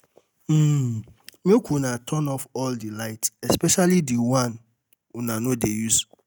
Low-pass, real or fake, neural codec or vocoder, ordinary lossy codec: none; real; none; none